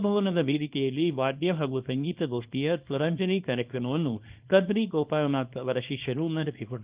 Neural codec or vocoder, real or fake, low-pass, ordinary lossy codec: codec, 24 kHz, 0.9 kbps, WavTokenizer, small release; fake; 3.6 kHz; Opus, 32 kbps